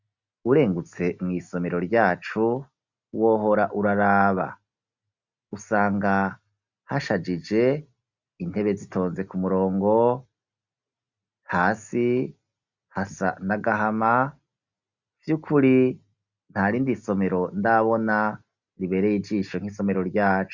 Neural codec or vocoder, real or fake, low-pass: none; real; 7.2 kHz